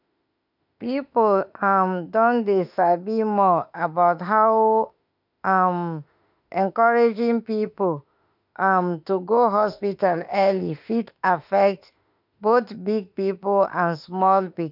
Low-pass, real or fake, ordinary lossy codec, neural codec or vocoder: 5.4 kHz; fake; none; autoencoder, 48 kHz, 32 numbers a frame, DAC-VAE, trained on Japanese speech